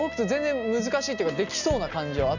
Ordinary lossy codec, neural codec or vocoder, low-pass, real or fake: none; none; 7.2 kHz; real